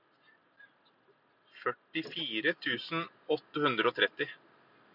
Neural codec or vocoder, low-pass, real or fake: none; 5.4 kHz; real